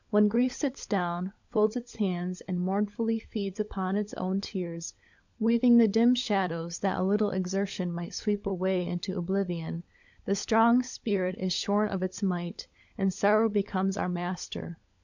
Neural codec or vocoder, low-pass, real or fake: codec, 16 kHz, 16 kbps, FunCodec, trained on LibriTTS, 50 frames a second; 7.2 kHz; fake